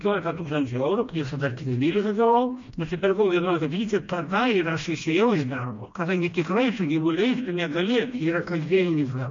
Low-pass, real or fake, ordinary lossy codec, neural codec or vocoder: 7.2 kHz; fake; MP3, 48 kbps; codec, 16 kHz, 1 kbps, FreqCodec, smaller model